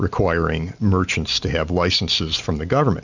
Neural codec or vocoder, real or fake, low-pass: none; real; 7.2 kHz